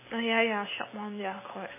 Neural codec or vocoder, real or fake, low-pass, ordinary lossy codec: none; real; 3.6 kHz; MP3, 16 kbps